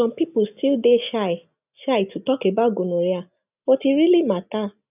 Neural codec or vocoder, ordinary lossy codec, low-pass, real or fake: none; none; 3.6 kHz; real